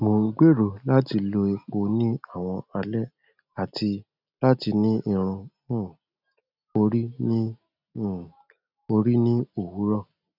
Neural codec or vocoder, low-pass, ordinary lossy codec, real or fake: none; 5.4 kHz; none; real